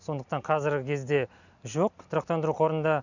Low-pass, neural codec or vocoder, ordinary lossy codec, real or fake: 7.2 kHz; none; none; real